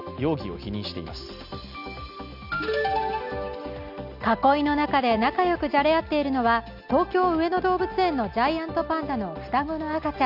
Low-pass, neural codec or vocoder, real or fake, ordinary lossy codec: 5.4 kHz; none; real; none